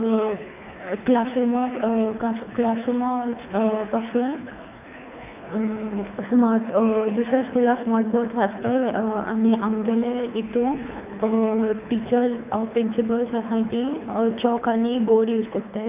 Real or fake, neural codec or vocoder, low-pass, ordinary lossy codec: fake; codec, 24 kHz, 3 kbps, HILCodec; 3.6 kHz; none